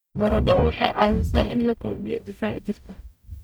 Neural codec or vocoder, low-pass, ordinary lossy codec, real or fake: codec, 44.1 kHz, 0.9 kbps, DAC; none; none; fake